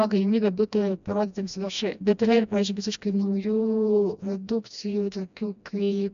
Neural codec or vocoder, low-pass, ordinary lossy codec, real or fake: codec, 16 kHz, 1 kbps, FreqCodec, smaller model; 7.2 kHz; AAC, 96 kbps; fake